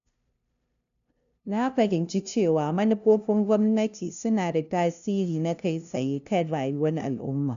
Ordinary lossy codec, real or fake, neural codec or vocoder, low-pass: none; fake; codec, 16 kHz, 0.5 kbps, FunCodec, trained on LibriTTS, 25 frames a second; 7.2 kHz